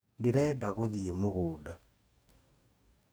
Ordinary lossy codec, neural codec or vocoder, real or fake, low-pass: none; codec, 44.1 kHz, 2.6 kbps, DAC; fake; none